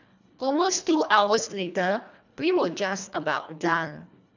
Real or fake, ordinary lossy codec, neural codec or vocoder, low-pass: fake; none; codec, 24 kHz, 1.5 kbps, HILCodec; 7.2 kHz